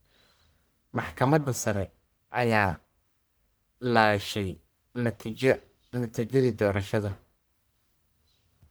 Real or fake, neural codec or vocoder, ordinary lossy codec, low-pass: fake; codec, 44.1 kHz, 1.7 kbps, Pupu-Codec; none; none